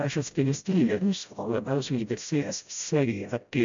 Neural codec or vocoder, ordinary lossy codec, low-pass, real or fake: codec, 16 kHz, 0.5 kbps, FreqCodec, smaller model; MP3, 64 kbps; 7.2 kHz; fake